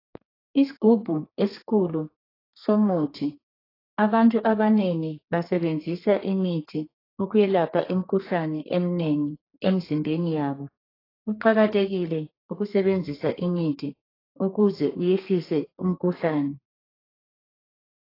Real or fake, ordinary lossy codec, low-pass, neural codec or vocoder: fake; AAC, 24 kbps; 5.4 kHz; codec, 32 kHz, 1.9 kbps, SNAC